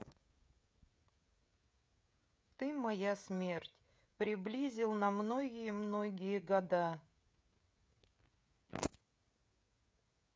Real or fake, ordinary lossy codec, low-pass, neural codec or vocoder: fake; none; none; codec, 16 kHz, 16 kbps, FreqCodec, smaller model